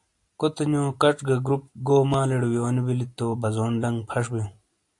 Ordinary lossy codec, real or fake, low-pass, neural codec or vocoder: AAC, 64 kbps; real; 10.8 kHz; none